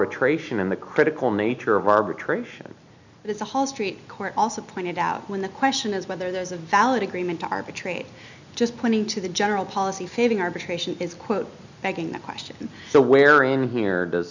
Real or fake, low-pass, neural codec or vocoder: real; 7.2 kHz; none